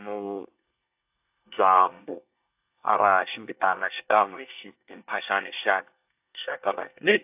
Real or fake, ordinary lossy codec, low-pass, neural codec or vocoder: fake; AAC, 32 kbps; 3.6 kHz; codec, 24 kHz, 1 kbps, SNAC